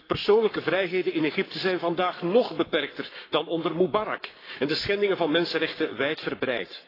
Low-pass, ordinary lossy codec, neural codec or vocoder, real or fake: 5.4 kHz; AAC, 24 kbps; codec, 44.1 kHz, 7.8 kbps, Pupu-Codec; fake